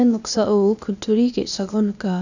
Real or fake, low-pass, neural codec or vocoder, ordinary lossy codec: fake; 7.2 kHz; codec, 16 kHz, 0.8 kbps, ZipCodec; none